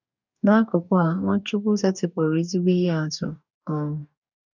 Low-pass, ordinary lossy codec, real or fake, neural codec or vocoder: 7.2 kHz; none; fake; codec, 44.1 kHz, 2.6 kbps, DAC